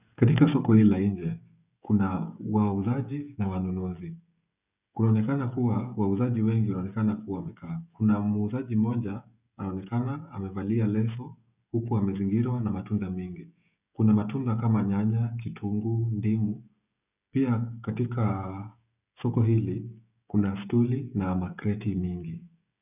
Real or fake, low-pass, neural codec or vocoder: fake; 3.6 kHz; codec, 16 kHz, 16 kbps, FreqCodec, smaller model